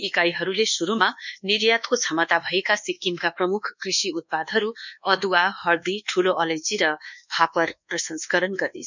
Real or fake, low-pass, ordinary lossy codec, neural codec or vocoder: fake; 7.2 kHz; none; codec, 24 kHz, 1.2 kbps, DualCodec